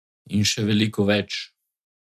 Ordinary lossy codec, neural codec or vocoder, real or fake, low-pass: none; none; real; 14.4 kHz